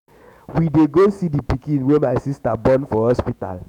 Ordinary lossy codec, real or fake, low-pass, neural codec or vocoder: none; fake; 19.8 kHz; autoencoder, 48 kHz, 128 numbers a frame, DAC-VAE, trained on Japanese speech